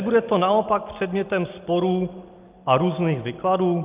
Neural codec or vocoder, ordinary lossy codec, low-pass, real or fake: none; Opus, 32 kbps; 3.6 kHz; real